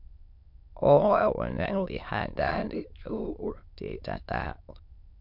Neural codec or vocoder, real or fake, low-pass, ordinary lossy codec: autoencoder, 22.05 kHz, a latent of 192 numbers a frame, VITS, trained on many speakers; fake; 5.4 kHz; MP3, 48 kbps